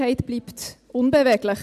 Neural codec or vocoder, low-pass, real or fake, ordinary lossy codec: none; 14.4 kHz; real; MP3, 96 kbps